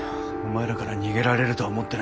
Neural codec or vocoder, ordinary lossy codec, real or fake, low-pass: none; none; real; none